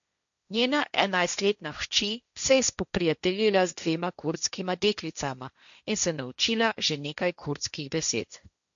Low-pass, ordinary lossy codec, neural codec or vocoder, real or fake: 7.2 kHz; none; codec, 16 kHz, 1.1 kbps, Voila-Tokenizer; fake